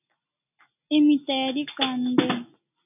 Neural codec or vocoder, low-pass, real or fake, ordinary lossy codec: none; 3.6 kHz; real; AAC, 32 kbps